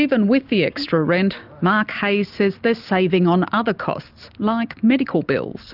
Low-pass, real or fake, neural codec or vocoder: 5.4 kHz; real; none